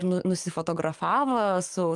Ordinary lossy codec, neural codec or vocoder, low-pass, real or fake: Opus, 32 kbps; codec, 44.1 kHz, 7.8 kbps, DAC; 10.8 kHz; fake